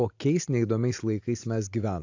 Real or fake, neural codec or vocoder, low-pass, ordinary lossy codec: real; none; 7.2 kHz; AAC, 48 kbps